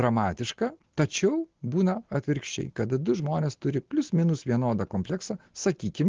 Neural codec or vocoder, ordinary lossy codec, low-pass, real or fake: none; Opus, 16 kbps; 7.2 kHz; real